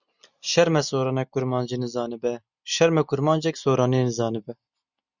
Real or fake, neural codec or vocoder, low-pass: real; none; 7.2 kHz